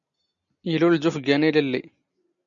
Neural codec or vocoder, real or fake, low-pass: none; real; 7.2 kHz